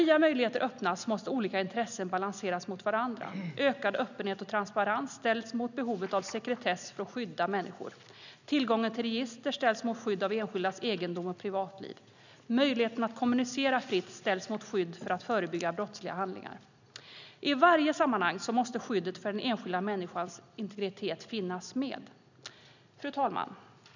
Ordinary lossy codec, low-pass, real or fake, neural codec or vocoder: none; 7.2 kHz; real; none